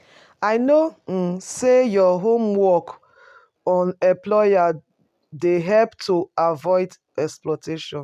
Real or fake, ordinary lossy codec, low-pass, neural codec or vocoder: real; none; 14.4 kHz; none